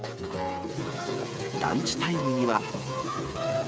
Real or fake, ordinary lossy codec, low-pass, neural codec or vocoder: fake; none; none; codec, 16 kHz, 8 kbps, FreqCodec, smaller model